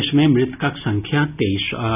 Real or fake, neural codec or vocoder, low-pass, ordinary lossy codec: real; none; 3.6 kHz; none